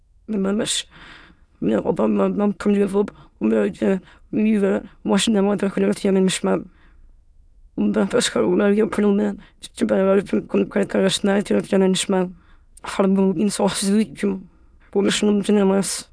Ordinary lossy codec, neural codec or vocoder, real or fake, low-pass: none; autoencoder, 22.05 kHz, a latent of 192 numbers a frame, VITS, trained on many speakers; fake; none